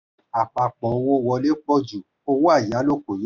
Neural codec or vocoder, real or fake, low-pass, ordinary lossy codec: none; real; 7.2 kHz; none